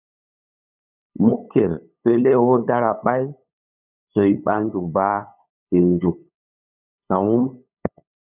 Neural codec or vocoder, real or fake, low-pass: codec, 16 kHz, 8 kbps, FunCodec, trained on LibriTTS, 25 frames a second; fake; 3.6 kHz